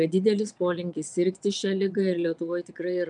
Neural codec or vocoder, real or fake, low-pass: vocoder, 22.05 kHz, 80 mel bands, WaveNeXt; fake; 9.9 kHz